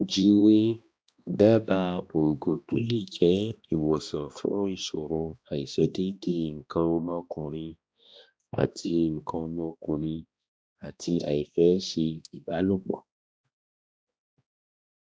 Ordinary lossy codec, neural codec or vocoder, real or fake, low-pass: none; codec, 16 kHz, 1 kbps, X-Codec, HuBERT features, trained on balanced general audio; fake; none